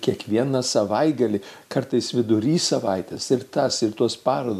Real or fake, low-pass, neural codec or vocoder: real; 14.4 kHz; none